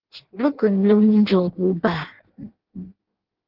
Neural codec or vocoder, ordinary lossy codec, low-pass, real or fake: codec, 16 kHz in and 24 kHz out, 0.6 kbps, FireRedTTS-2 codec; Opus, 16 kbps; 5.4 kHz; fake